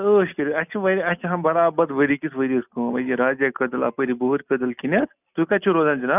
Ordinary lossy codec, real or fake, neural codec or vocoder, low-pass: none; real; none; 3.6 kHz